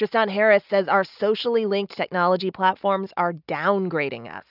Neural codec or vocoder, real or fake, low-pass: none; real; 5.4 kHz